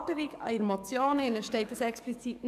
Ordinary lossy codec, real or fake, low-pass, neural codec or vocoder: none; fake; 14.4 kHz; codec, 44.1 kHz, 7.8 kbps, DAC